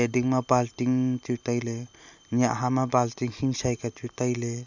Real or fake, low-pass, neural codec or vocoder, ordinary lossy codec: real; 7.2 kHz; none; none